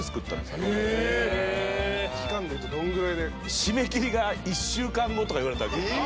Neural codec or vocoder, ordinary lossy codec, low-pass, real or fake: none; none; none; real